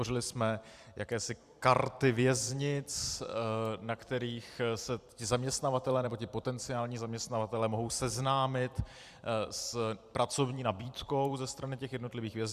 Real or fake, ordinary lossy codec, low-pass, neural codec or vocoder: fake; Opus, 64 kbps; 14.4 kHz; vocoder, 44.1 kHz, 128 mel bands every 256 samples, BigVGAN v2